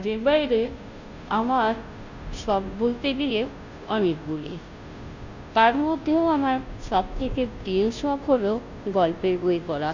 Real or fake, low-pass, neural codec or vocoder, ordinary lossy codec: fake; 7.2 kHz; codec, 16 kHz, 0.5 kbps, FunCodec, trained on Chinese and English, 25 frames a second; Opus, 64 kbps